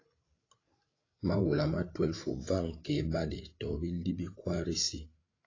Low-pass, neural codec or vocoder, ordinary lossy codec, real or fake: 7.2 kHz; codec, 16 kHz, 8 kbps, FreqCodec, larger model; AAC, 32 kbps; fake